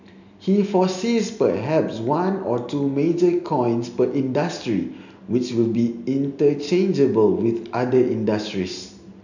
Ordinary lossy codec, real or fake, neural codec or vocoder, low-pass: none; real; none; 7.2 kHz